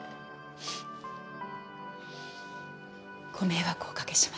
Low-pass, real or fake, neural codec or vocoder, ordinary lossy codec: none; real; none; none